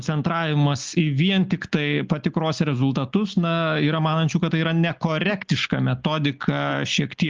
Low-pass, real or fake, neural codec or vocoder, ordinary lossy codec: 7.2 kHz; real; none; Opus, 24 kbps